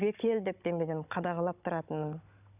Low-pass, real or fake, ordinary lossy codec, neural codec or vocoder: 3.6 kHz; fake; none; codec, 16 kHz, 16 kbps, FunCodec, trained on Chinese and English, 50 frames a second